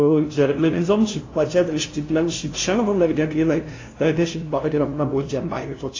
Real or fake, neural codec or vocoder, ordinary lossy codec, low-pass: fake; codec, 16 kHz, 0.5 kbps, FunCodec, trained on LibriTTS, 25 frames a second; AAC, 32 kbps; 7.2 kHz